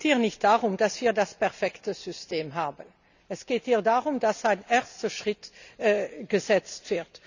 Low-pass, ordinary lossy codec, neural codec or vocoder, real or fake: 7.2 kHz; none; none; real